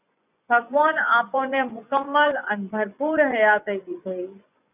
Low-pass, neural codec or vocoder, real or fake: 3.6 kHz; vocoder, 24 kHz, 100 mel bands, Vocos; fake